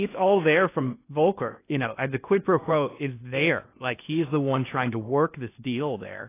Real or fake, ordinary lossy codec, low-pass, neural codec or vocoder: fake; AAC, 24 kbps; 3.6 kHz; codec, 16 kHz in and 24 kHz out, 0.8 kbps, FocalCodec, streaming, 65536 codes